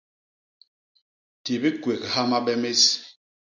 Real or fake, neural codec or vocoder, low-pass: real; none; 7.2 kHz